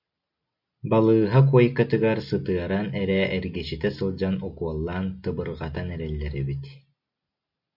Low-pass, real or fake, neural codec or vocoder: 5.4 kHz; real; none